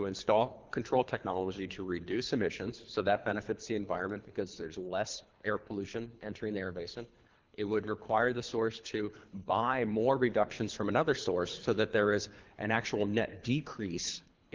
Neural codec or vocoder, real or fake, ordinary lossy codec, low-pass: codec, 24 kHz, 3 kbps, HILCodec; fake; Opus, 24 kbps; 7.2 kHz